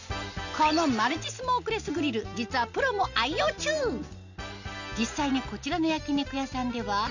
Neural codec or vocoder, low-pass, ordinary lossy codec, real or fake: none; 7.2 kHz; none; real